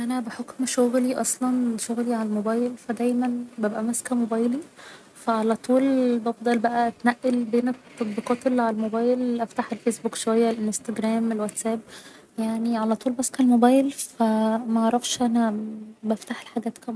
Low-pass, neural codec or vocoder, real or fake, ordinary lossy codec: none; none; real; none